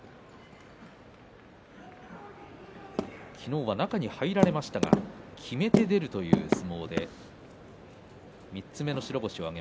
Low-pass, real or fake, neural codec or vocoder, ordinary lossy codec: none; real; none; none